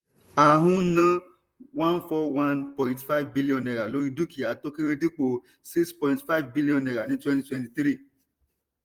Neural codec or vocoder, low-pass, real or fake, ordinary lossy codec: vocoder, 44.1 kHz, 128 mel bands, Pupu-Vocoder; 19.8 kHz; fake; Opus, 32 kbps